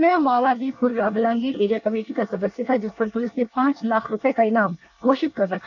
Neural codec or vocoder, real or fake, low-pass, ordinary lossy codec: codec, 24 kHz, 1 kbps, SNAC; fake; 7.2 kHz; none